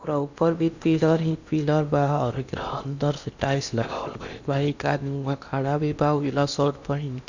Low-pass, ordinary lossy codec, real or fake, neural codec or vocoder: 7.2 kHz; none; fake; codec, 16 kHz in and 24 kHz out, 0.8 kbps, FocalCodec, streaming, 65536 codes